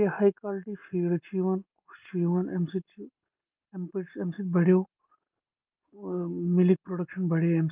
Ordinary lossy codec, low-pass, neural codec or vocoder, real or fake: Opus, 24 kbps; 3.6 kHz; none; real